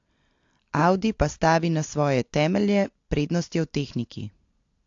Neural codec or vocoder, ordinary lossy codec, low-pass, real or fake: none; AAC, 48 kbps; 7.2 kHz; real